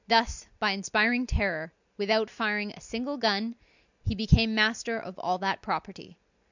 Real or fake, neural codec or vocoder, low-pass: real; none; 7.2 kHz